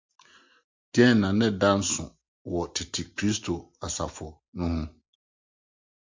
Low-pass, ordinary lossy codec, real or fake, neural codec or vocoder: 7.2 kHz; MP3, 64 kbps; real; none